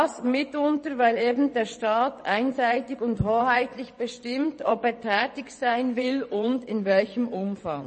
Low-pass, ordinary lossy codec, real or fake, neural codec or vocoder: 9.9 kHz; MP3, 32 kbps; fake; vocoder, 22.05 kHz, 80 mel bands, WaveNeXt